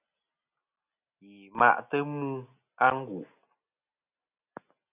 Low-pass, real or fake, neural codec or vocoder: 3.6 kHz; real; none